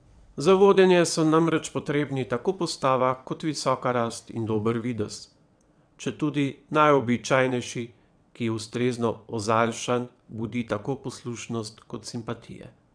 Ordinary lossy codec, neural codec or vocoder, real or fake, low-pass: none; vocoder, 22.05 kHz, 80 mel bands, WaveNeXt; fake; 9.9 kHz